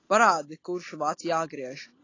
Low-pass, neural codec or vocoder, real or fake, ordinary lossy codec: 7.2 kHz; none; real; AAC, 32 kbps